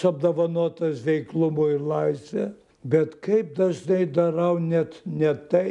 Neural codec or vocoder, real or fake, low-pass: none; real; 10.8 kHz